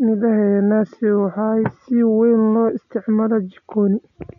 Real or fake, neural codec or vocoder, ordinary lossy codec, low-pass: real; none; none; 7.2 kHz